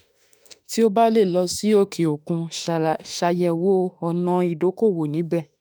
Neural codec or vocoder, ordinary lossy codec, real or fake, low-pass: autoencoder, 48 kHz, 32 numbers a frame, DAC-VAE, trained on Japanese speech; none; fake; none